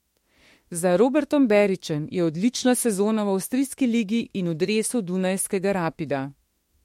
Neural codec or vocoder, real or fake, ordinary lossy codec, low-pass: autoencoder, 48 kHz, 32 numbers a frame, DAC-VAE, trained on Japanese speech; fake; MP3, 64 kbps; 19.8 kHz